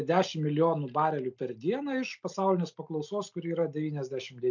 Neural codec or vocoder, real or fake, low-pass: none; real; 7.2 kHz